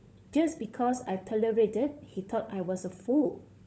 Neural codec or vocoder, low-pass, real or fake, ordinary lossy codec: codec, 16 kHz, 16 kbps, FunCodec, trained on LibriTTS, 50 frames a second; none; fake; none